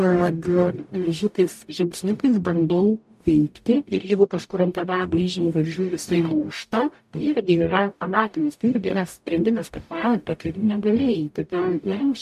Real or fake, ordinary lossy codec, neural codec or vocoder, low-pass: fake; MP3, 64 kbps; codec, 44.1 kHz, 0.9 kbps, DAC; 14.4 kHz